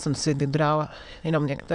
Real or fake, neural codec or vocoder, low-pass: fake; autoencoder, 22.05 kHz, a latent of 192 numbers a frame, VITS, trained on many speakers; 9.9 kHz